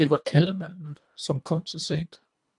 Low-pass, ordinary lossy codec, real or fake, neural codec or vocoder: 10.8 kHz; MP3, 96 kbps; fake; codec, 24 kHz, 1.5 kbps, HILCodec